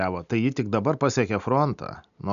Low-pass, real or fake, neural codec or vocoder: 7.2 kHz; real; none